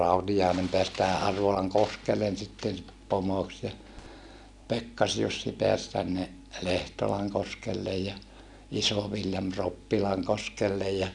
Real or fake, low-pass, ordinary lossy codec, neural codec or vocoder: real; 10.8 kHz; none; none